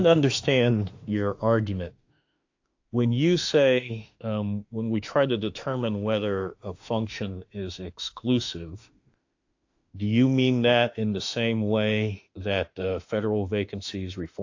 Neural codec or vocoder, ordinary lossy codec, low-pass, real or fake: autoencoder, 48 kHz, 32 numbers a frame, DAC-VAE, trained on Japanese speech; AAC, 48 kbps; 7.2 kHz; fake